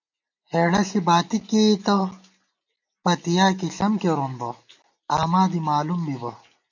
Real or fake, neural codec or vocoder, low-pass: real; none; 7.2 kHz